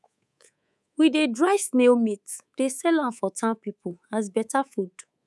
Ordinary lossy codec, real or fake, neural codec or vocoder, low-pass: none; fake; codec, 24 kHz, 3.1 kbps, DualCodec; none